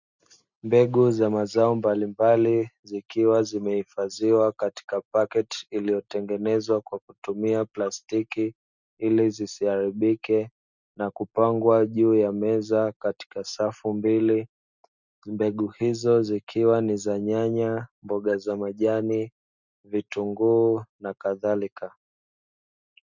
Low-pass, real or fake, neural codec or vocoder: 7.2 kHz; real; none